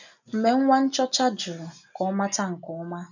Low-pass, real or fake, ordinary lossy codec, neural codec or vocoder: 7.2 kHz; real; none; none